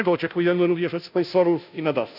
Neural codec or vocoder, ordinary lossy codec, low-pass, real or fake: codec, 16 kHz, 0.5 kbps, FunCodec, trained on Chinese and English, 25 frames a second; none; 5.4 kHz; fake